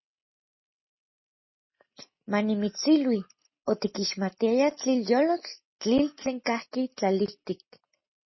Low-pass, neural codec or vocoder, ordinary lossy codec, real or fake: 7.2 kHz; none; MP3, 24 kbps; real